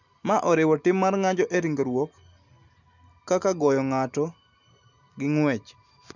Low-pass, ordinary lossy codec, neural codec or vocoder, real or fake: 7.2 kHz; none; none; real